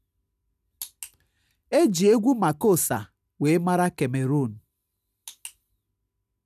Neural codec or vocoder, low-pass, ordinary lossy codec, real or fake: none; 14.4 kHz; none; real